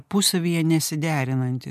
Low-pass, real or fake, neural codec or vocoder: 14.4 kHz; real; none